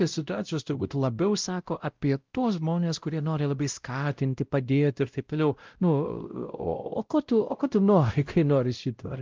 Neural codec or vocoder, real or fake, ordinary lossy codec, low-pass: codec, 16 kHz, 0.5 kbps, X-Codec, WavLM features, trained on Multilingual LibriSpeech; fake; Opus, 32 kbps; 7.2 kHz